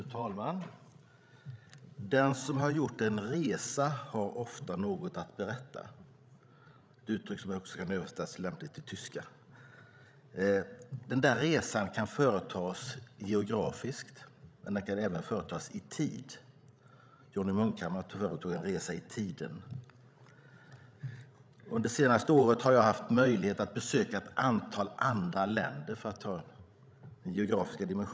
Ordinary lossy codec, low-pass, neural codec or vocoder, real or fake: none; none; codec, 16 kHz, 16 kbps, FreqCodec, larger model; fake